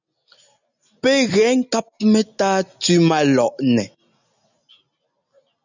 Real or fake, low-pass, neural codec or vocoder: real; 7.2 kHz; none